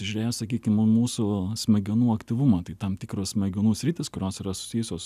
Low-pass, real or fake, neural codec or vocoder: 14.4 kHz; real; none